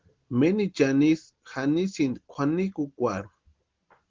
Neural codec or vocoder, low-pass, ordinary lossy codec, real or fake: none; 7.2 kHz; Opus, 16 kbps; real